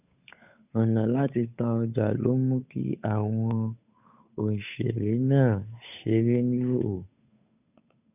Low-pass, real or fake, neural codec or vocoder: 3.6 kHz; fake; codec, 16 kHz, 8 kbps, FunCodec, trained on Chinese and English, 25 frames a second